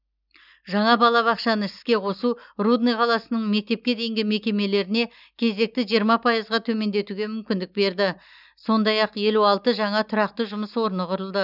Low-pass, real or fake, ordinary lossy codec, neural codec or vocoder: 5.4 kHz; real; none; none